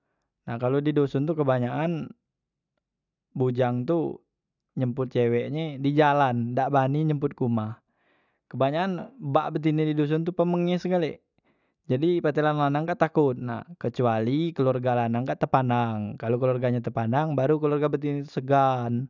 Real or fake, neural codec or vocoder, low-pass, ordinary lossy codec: real; none; 7.2 kHz; none